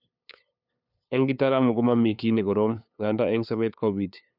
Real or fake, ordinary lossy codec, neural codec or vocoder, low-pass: fake; none; codec, 16 kHz, 2 kbps, FunCodec, trained on LibriTTS, 25 frames a second; 5.4 kHz